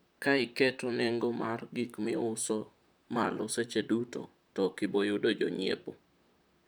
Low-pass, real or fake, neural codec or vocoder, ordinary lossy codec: none; fake; vocoder, 44.1 kHz, 128 mel bands, Pupu-Vocoder; none